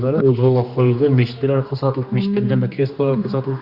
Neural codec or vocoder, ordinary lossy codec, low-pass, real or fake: codec, 16 kHz, 2 kbps, X-Codec, HuBERT features, trained on general audio; Opus, 64 kbps; 5.4 kHz; fake